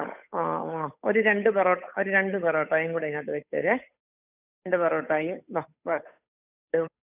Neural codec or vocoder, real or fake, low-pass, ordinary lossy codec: codec, 16 kHz, 8 kbps, FunCodec, trained on Chinese and English, 25 frames a second; fake; 3.6 kHz; none